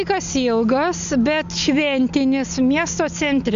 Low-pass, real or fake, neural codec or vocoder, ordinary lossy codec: 7.2 kHz; real; none; MP3, 64 kbps